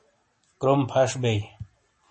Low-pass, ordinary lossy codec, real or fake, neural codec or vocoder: 10.8 kHz; MP3, 32 kbps; real; none